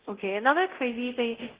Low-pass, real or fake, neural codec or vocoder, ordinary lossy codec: 3.6 kHz; fake; codec, 24 kHz, 0.9 kbps, DualCodec; Opus, 16 kbps